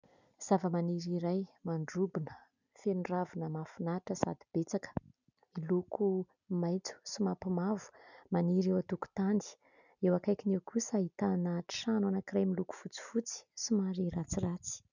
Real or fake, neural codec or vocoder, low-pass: real; none; 7.2 kHz